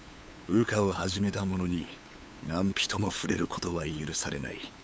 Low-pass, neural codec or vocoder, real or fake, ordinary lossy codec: none; codec, 16 kHz, 8 kbps, FunCodec, trained on LibriTTS, 25 frames a second; fake; none